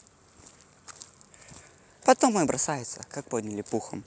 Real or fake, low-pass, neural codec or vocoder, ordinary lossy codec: real; none; none; none